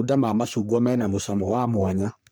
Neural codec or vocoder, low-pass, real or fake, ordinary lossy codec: codec, 44.1 kHz, 3.4 kbps, Pupu-Codec; none; fake; none